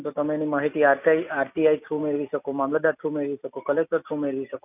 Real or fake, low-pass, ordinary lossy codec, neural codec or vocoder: real; 3.6 kHz; none; none